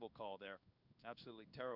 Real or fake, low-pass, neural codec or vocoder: fake; 5.4 kHz; codec, 16 kHz in and 24 kHz out, 1 kbps, XY-Tokenizer